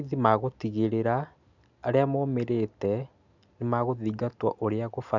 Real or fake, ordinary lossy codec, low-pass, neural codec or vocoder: real; none; 7.2 kHz; none